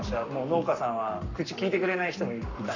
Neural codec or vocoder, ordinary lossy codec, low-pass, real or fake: codec, 44.1 kHz, 7.8 kbps, Pupu-Codec; none; 7.2 kHz; fake